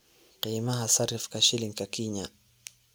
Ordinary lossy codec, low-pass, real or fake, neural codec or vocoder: none; none; real; none